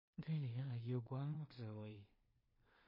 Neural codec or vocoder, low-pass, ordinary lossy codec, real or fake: codec, 16 kHz in and 24 kHz out, 0.4 kbps, LongCat-Audio-Codec, two codebook decoder; 5.4 kHz; MP3, 24 kbps; fake